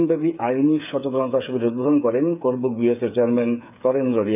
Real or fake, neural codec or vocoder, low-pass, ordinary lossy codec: fake; codec, 16 kHz, 8 kbps, FreqCodec, smaller model; 3.6 kHz; none